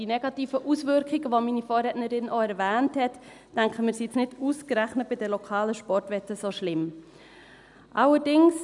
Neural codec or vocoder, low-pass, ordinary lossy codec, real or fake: none; 10.8 kHz; none; real